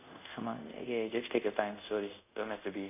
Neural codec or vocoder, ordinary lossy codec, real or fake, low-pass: codec, 24 kHz, 0.5 kbps, DualCodec; none; fake; 3.6 kHz